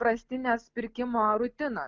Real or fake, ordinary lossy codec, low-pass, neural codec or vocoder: real; Opus, 32 kbps; 7.2 kHz; none